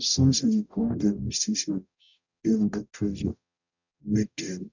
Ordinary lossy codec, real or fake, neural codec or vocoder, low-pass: none; fake; codec, 44.1 kHz, 0.9 kbps, DAC; 7.2 kHz